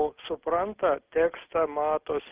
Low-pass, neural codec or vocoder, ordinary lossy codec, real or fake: 3.6 kHz; none; Opus, 32 kbps; real